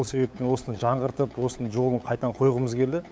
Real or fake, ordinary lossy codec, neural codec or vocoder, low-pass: fake; none; codec, 16 kHz, 8 kbps, FunCodec, trained on LibriTTS, 25 frames a second; none